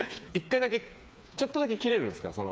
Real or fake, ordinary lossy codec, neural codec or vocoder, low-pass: fake; none; codec, 16 kHz, 4 kbps, FreqCodec, smaller model; none